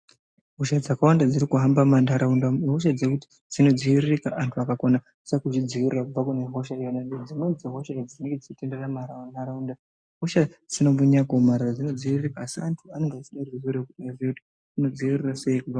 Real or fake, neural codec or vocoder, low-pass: real; none; 9.9 kHz